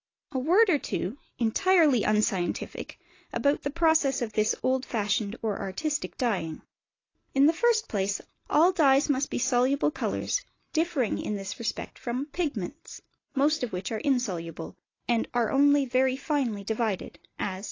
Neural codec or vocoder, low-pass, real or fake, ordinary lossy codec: none; 7.2 kHz; real; AAC, 32 kbps